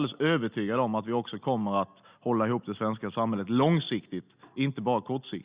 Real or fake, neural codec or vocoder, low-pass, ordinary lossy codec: real; none; 3.6 kHz; Opus, 64 kbps